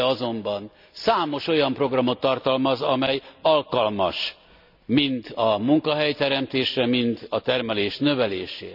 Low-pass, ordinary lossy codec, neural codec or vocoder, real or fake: 5.4 kHz; none; none; real